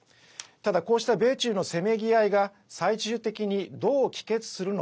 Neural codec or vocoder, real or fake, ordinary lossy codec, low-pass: none; real; none; none